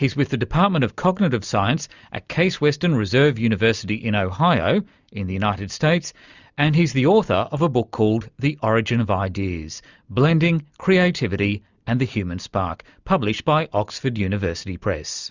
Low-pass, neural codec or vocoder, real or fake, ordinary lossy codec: 7.2 kHz; none; real; Opus, 64 kbps